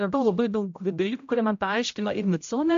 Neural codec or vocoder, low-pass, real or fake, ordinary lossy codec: codec, 16 kHz, 0.5 kbps, X-Codec, HuBERT features, trained on general audio; 7.2 kHz; fake; AAC, 64 kbps